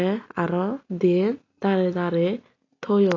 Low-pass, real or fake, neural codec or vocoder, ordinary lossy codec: 7.2 kHz; real; none; AAC, 32 kbps